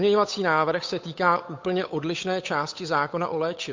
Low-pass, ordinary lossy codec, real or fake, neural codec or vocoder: 7.2 kHz; MP3, 48 kbps; fake; codec, 16 kHz, 8 kbps, FunCodec, trained on Chinese and English, 25 frames a second